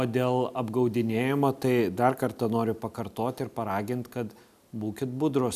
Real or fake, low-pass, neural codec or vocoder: real; 14.4 kHz; none